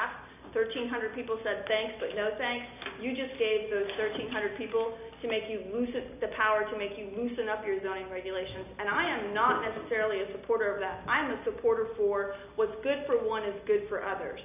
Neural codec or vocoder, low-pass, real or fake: none; 3.6 kHz; real